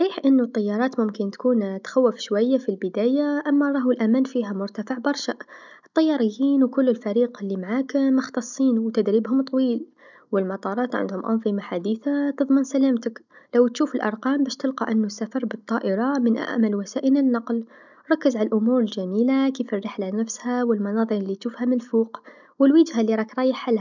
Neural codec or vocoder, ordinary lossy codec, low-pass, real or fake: none; none; 7.2 kHz; real